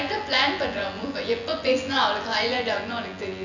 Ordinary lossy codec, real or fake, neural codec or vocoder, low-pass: none; fake; vocoder, 24 kHz, 100 mel bands, Vocos; 7.2 kHz